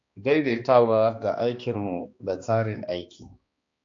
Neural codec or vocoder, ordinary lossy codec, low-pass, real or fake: codec, 16 kHz, 2 kbps, X-Codec, HuBERT features, trained on balanced general audio; AAC, 48 kbps; 7.2 kHz; fake